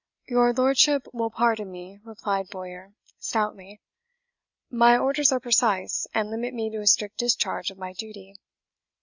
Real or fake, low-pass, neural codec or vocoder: real; 7.2 kHz; none